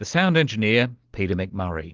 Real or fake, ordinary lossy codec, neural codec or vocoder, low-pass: real; Opus, 16 kbps; none; 7.2 kHz